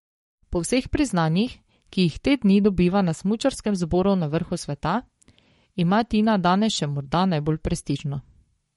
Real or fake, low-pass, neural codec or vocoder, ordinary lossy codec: fake; 19.8 kHz; codec, 44.1 kHz, 7.8 kbps, Pupu-Codec; MP3, 48 kbps